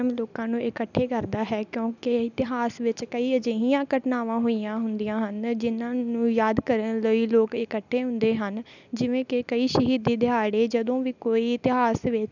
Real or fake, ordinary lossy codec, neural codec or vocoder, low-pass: real; none; none; 7.2 kHz